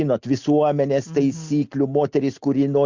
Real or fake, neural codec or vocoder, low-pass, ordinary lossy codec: real; none; 7.2 kHz; Opus, 64 kbps